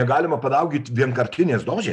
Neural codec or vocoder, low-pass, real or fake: none; 10.8 kHz; real